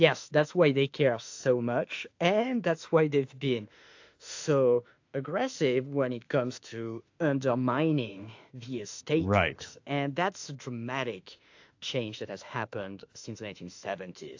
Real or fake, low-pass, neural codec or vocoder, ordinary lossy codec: fake; 7.2 kHz; autoencoder, 48 kHz, 32 numbers a frame, DAC-VAE, trained on Japanese speech; AAC, 48 kbps